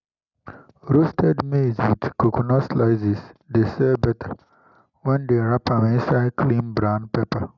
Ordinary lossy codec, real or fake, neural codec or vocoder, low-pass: none; real; none; 7.2 kHz